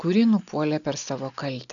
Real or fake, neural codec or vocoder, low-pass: real; none; 7.2 kHz